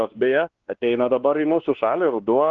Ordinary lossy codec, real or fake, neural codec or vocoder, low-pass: Opus, 16 kbps; fake; codec, 16 kHz, 2 kbps, X-Codec, WavLM features, trained on Multilingual LibriSpeech; 7.2 kHz